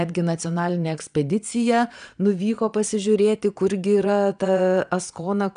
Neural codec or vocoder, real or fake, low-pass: vocoder, 22.05 kHz, 80 mel bands, Vocos; fake; 9.9 kHz